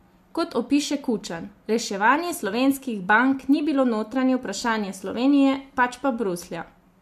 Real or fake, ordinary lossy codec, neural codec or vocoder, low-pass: real; MP3, 64 kbps; none; 14.4 kHz